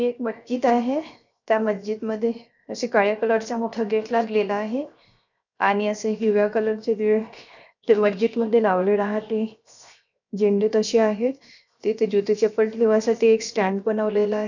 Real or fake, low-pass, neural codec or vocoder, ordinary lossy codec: fake; 7.2 kHz; codec, 16 kHz, 0.7 kbps, FocalCodec; none